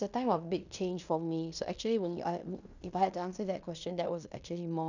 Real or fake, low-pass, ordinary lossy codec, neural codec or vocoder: fake; 7.2 kHz; none; codec, 16 kHz in and 24 kHz out, 0.9 kbps, LongCat-Audio-Codec, fine tuned four codebook decoder